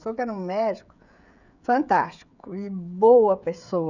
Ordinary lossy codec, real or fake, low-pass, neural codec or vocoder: none; fake; 7.2 kHz; codec, 16 kHz, 16 kbps, FreqCodec, smaller model